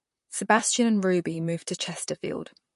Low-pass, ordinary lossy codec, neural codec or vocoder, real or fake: 14.4 kHz; MP3, 48 kbps; vocoder, 44.1 kHz, 128 mel bands, Pupu-Vocoder; fake